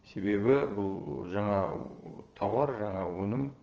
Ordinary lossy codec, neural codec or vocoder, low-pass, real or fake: Opus, 24 kbps; vocoder, 22.05 kHz, 80 mel bands, WaveNeXt; 7.2 kHz; fake